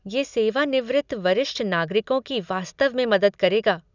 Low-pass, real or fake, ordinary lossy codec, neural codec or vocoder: 7.2 kHz; real; none; none